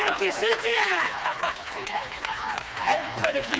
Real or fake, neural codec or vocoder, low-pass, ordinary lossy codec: fake; codec, 16 kHz, 2 kbps, FreqCodec, smaller model; none; none